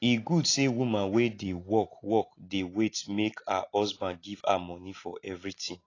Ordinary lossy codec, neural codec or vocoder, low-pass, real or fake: AAC, 32 kbps; none; 7.2 kHz; real